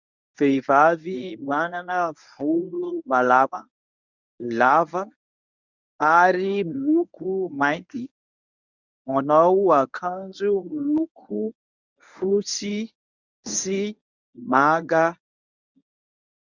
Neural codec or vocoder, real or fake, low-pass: codec, 24 kHz, 0.9 kbps, WavTokenizer, medium speech release version 1; fake; 7.2 kHz